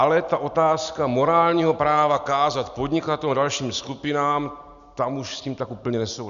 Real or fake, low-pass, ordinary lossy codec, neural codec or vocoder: real; 7.2 kHz; AAC, 96 kbps; none